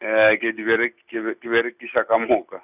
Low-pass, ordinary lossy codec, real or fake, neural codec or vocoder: 3.6 kHz; none; real; none